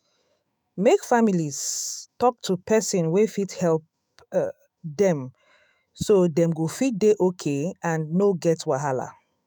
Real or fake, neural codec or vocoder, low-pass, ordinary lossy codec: fake; autoencoder, 48 kHz, 128 numbers a frame, DAC-VAE, trained on Japanese speech; none; none